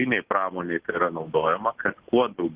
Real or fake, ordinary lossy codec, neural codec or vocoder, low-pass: real; Opus, 16 kbps; none; 3.6 kHz